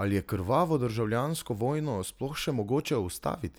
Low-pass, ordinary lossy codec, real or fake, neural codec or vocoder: none; none; real; none